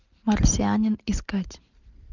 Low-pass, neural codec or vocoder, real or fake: 7.2 kHz; none; real